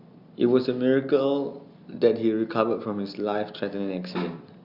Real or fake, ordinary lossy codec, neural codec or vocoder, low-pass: real; Opus, 64 kbps; none; 5.4 kHz